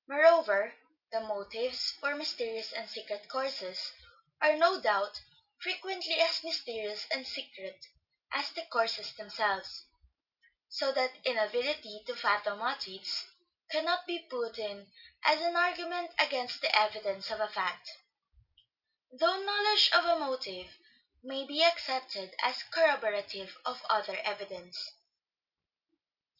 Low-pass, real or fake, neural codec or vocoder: 5.4 kHz; real; none